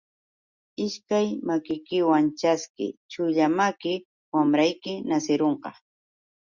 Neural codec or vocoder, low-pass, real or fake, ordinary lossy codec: none; 7.2 kHz; real; Opus, 64 kbps